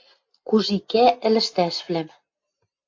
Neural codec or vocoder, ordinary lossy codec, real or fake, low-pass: none; AAC, 48 kbps; real; 7.2 kHz